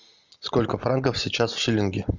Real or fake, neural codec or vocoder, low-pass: real; none; 7.2 kHz